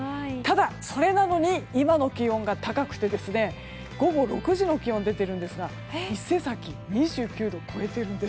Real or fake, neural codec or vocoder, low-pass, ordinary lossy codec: real; none; none; none